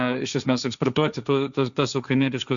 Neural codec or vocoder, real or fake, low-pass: codec, 16 kHz, 1.1 kbps, Voila-Tokenizer; fake; 7.2 kHz